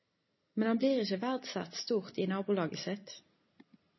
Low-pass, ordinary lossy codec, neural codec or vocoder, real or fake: 7.2 kHz; MP3, 24 kbps; none; real